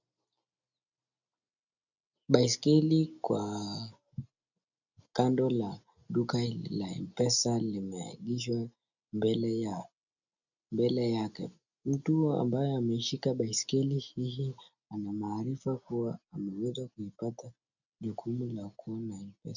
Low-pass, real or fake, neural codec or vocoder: 7.2 kHz; real; none